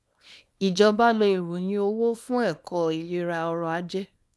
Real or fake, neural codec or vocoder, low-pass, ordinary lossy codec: fake; codec, 24 kHz, 0.9 kbps, WavTokenizer, small release; none; none